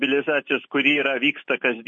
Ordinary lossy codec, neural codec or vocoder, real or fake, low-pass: MP3, 32 kbps; none; real; 10.8 kHz